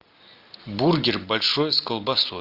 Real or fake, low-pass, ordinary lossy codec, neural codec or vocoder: real; 5.4 kHz; Opus, 24 kbps; none